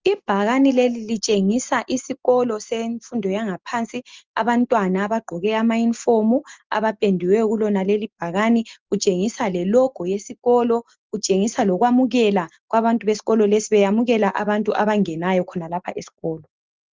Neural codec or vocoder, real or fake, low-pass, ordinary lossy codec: none; real; 7.2 kHz; Opus, 32 kbps